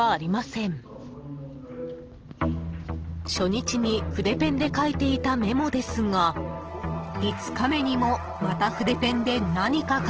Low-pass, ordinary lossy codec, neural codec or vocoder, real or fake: 7.2 kHz; Opus, 16 kbps; none; real